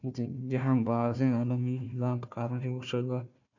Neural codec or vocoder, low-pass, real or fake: codec, 16 kHz, 1 kbps, FunCodec, trained on Chinese and English, 50 frames a second; 7.2 kHz; fake